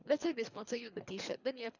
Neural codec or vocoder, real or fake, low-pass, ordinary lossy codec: codec, 24 kHz, 3 kbps, HILCodec; fake; 7.2 kHz; none